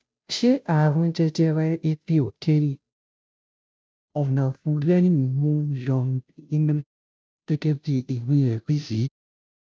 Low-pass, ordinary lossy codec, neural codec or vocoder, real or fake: none; none; codec, 16 kHz, 0.5 kbps, FunCodec, trained on Chinese and English, 25 frames a second; fake